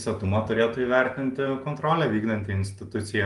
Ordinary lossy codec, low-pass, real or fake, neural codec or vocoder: Opus, 32 kbps; 10.8 kHz; real; none